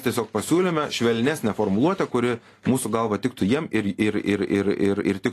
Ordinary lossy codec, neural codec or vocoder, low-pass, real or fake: AAC, 48 kbps; none; 14.4 kHz; real